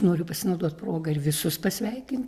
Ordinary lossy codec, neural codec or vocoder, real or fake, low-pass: Opus, 64 kbps; none; real; 14.4 kHz